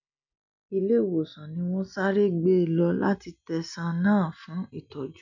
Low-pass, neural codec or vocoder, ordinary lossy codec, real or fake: 7.2 kHz; none; none; real